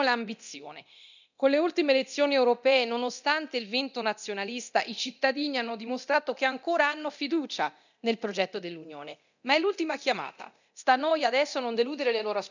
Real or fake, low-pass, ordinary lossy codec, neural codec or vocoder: fake; 7.2 kHz; none; codec, 24 kHz, 0.9 kbps, DualCodec